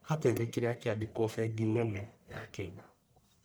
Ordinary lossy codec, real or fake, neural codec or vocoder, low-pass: none; fake; codec, 44.1 kHz, 1.7 kbps, Pupu-Codec; none